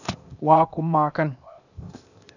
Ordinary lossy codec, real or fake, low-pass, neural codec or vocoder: none; fake; 7.2 kHz; codec, 16 kHz, 0.8 kbps, ZipCodec